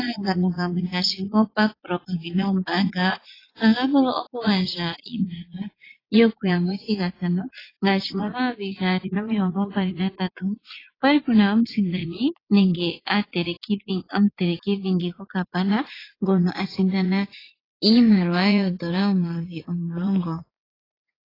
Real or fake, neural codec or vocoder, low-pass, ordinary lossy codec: fake; vocoder, 44.1 kHz, 80 mel bands, Vocos; 5.4 kHz; AAC, 24 kbps